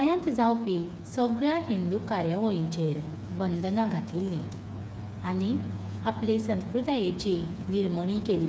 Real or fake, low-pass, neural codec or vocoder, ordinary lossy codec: fake; none; codec, 16 kHz, 4 kbps, FreqCodec, smaller model; none